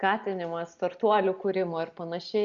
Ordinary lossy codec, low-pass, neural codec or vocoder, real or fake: Opus, 64 kbps; 7.2 kHz; none; real